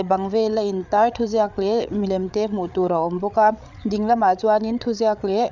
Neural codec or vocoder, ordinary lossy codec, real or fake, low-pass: codec, 16 kHz, 8 kbps, FreqCodec, larger model; none; fake; 7.2 kHz